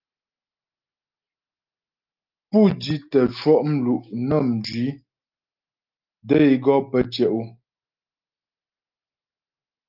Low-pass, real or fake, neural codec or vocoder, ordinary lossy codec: 5.4 kHz; real; none; Opus, 24 kbps